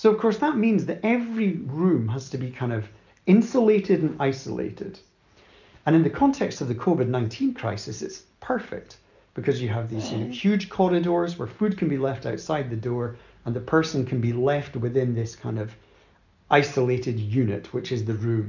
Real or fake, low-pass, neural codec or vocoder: real; 7.2 kHz; none